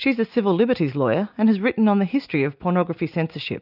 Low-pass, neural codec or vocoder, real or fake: 5.4 kHz; none; real